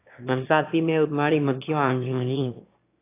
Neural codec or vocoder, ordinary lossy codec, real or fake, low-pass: autoencoder, 22.05 kHz, a latent of 192 numbers a frame, VITS, trained on one speaker; AAC, 24 kbps; fake; 3.6 kHz